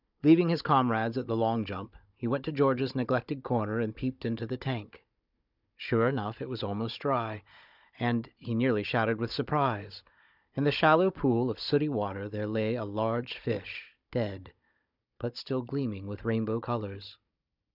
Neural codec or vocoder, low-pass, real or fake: codec, 16 kHz, 16 kbps, FunCodec, trained on Chinese and English, 50 frames a second; 5.4 kHz; fake